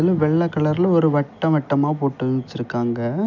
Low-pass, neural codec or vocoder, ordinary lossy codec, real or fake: 7.2 kHz; none; none; real